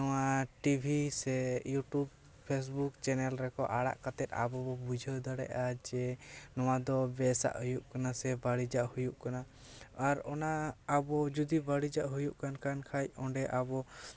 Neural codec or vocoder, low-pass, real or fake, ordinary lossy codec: none; none; real; none